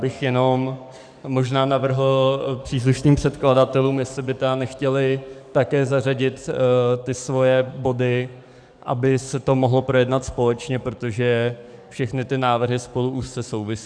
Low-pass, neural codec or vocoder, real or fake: 9.9 kHz; codec, 44.1 kHz, 7.8 kbps, DAC; fake